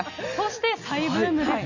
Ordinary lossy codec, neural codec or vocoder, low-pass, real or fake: none; none; 7.2 kHz; real